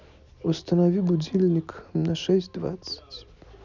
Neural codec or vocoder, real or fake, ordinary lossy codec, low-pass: none; real; none; 7.2 kHz